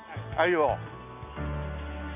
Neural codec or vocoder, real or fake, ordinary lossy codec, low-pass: none; real; none; 3.6 kHz